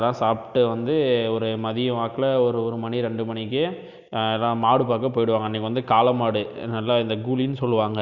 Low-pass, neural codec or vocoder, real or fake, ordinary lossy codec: 7.2 kHz; none; real; none